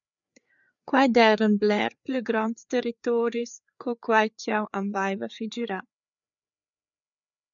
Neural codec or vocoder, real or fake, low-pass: codec, 16 kHz, 4 kbps, FreqCodec, larger model; fake; 7.2 kHz